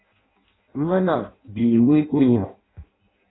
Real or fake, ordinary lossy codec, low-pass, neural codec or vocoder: fake; AAC, 16 kbps; 7.2 kHz; codec, 16 kHz in and 24 kHz out, 0.6 kbps, FireRedTTS-2 codec